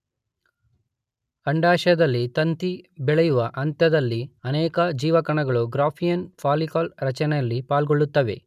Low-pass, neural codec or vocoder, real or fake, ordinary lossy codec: 14.4 kHz; none; real; none